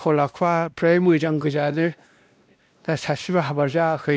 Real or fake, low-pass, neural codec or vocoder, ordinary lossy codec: fake; none; codec, 16 kHz, 1 kbps, X-Codec, WavLM features, trained on Multilingual LibriSpeech; none